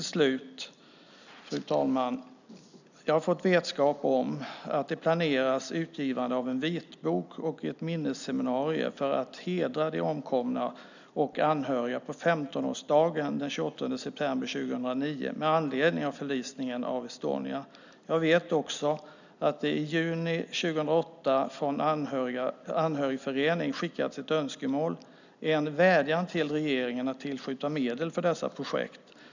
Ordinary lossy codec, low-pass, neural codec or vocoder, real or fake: none; 7.2 kHz; none; real